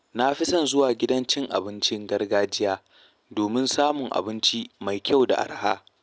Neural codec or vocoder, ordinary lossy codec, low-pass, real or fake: none; none; none; real